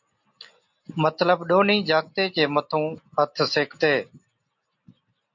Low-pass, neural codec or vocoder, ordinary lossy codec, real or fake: 7.2 kHz; none; MP3, 48 kbps; real